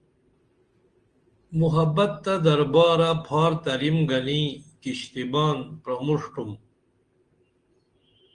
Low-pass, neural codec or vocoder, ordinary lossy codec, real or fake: 9.9 kHz; none; Opus, 24 kbps; real